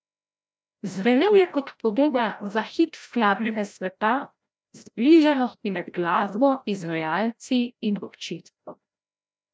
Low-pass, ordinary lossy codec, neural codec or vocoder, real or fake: none; none; codec, 16 kHz, 0.5 kbps, FreqCodec, larger model; fake